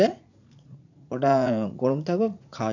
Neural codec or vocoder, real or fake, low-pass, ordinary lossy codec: vocoder, 44.1 kHz, 80 mel bands, Vocos; fake; 7.2 kHz; none